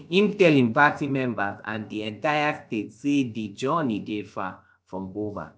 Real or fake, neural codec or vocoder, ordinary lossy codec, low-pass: fake; codec, 16 kHz, about 1 kbps, DyCAST, with the encoder's durations; none; none